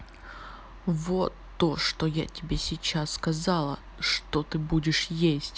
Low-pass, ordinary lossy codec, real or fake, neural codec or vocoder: none; none; real; none